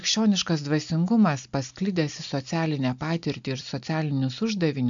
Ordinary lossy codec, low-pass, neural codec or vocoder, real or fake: MP3, 64 kbps; 7.2 kHz; none; real